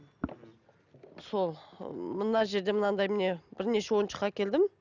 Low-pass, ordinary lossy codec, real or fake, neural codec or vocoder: 7.2 kHz; none; real; none